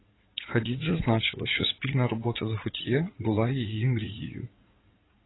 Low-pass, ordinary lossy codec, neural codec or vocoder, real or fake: 7.2 kHz; AAC, 16 kbps; vocoder, 44.1 kHz, 80 mel bands, Vocos; fake